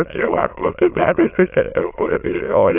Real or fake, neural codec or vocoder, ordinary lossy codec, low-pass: fake; autoencoder, 22.05 kHz, a latent of 192 numbers a frame, VITS, trained on many speakers; AAC, 32 kbps; 3.6 kHz